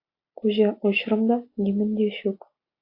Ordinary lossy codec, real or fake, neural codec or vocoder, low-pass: AAC, 32 kbps; real; none; 5.4 kHz